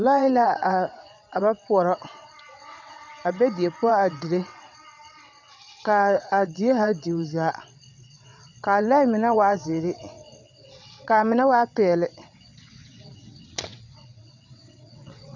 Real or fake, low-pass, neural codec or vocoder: fake; 7.2 kHz; vocoder, 22.05 kHz, 80 mel bands, WaveNeXt